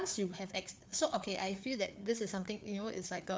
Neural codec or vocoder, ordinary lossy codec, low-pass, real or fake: codec, 16 kHz, 4 kbps, FreqCodec, larger model; none; none; fake